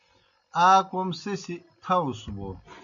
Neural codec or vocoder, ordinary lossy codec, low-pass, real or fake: none; MP3, 96 kbps; 7.2 kHz; real